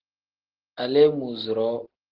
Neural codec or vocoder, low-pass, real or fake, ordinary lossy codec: none; 5.4 kHz; real; Opus, 16 kbps